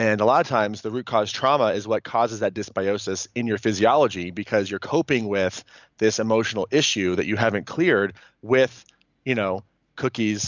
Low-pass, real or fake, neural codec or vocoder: 7.2 kHz; real; none